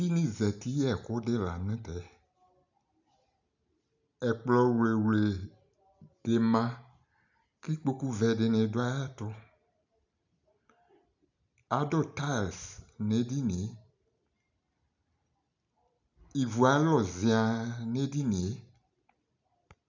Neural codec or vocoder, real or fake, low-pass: none; real; 7.2 kHz